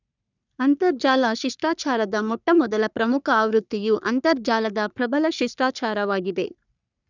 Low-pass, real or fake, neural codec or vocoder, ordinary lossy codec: 7.2 kHz; fake; codec, 44.1 kHz, 3.4 kbps, Pupu-Codec; none